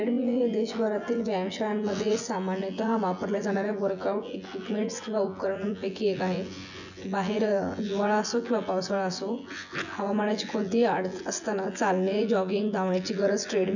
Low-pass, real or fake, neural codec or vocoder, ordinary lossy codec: 7.2 kHz; fake; vocoder, 24 kHz, 100 mel bands, Vocos; none